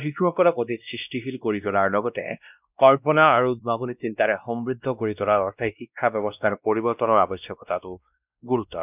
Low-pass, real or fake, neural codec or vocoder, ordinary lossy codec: 3.6 kHz; fake; codec, 16 kHz, 1 kbps, X-Codec, WavLM features, trained on Multilingual LibriSpeech; none